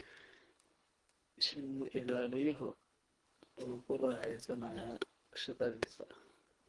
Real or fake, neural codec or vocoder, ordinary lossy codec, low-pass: fake; codec, 24 kHz, 1.5 kbps, HILCodec; Opus, 24 kbps; 10.8 kHz